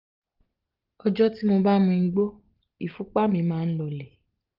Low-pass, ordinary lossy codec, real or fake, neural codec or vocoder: 5.4 kHz; Opus, 24 kbps; real; none